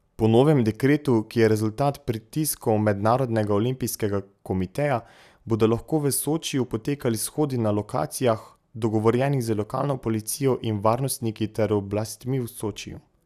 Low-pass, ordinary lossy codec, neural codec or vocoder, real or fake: 14.4 kHz; none; none; real